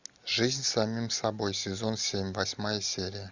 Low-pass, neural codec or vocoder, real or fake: 7.2 kHz; none; real